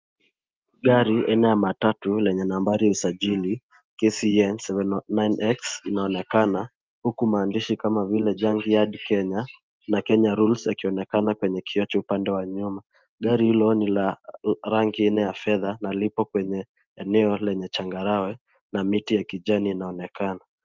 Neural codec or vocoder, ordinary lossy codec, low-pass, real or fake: none; Opus, 24 kbps; 7.2 kHz; real